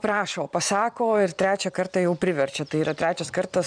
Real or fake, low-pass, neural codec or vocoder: fake; 9.9 kHz; vocoder, 44.1 kHz, 128 mel bands every 512 samples, BigVGAN v2